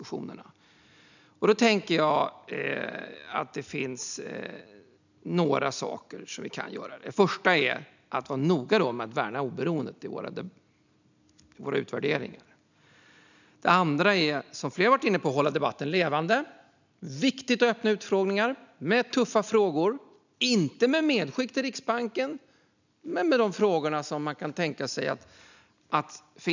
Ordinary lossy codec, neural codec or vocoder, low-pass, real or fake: none; none; 7.2 kHz; real